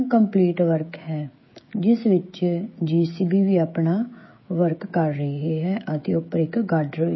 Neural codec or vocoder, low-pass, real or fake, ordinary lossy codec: codec, 16 kHz, 16 kbps, FreqCodec, smaller model; 7.2 kHz; fake; MP3, 24 kbps